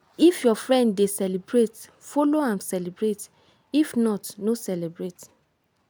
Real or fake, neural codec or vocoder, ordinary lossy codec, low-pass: real; none; none; none